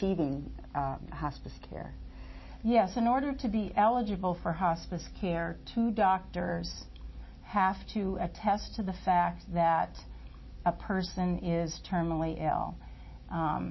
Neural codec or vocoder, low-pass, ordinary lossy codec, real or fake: none; 7.2 kHz; MP3, 24 kbps; real